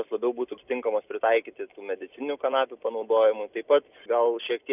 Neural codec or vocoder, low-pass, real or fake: none; 3.6 kHz; real